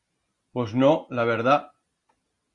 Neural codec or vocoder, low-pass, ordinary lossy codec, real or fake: none; 10.8 kHz; Opus, 64 kbps; real